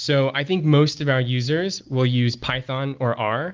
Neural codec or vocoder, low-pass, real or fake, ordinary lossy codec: none; 7.2 kHz; real; Opus, 24 kbps